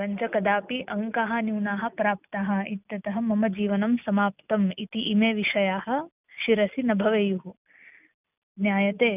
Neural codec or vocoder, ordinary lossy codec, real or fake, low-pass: none; none; real; 3.6 kHz